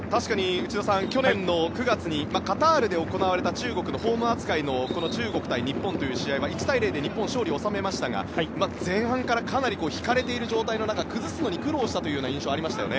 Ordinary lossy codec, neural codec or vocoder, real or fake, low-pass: none; none; real; none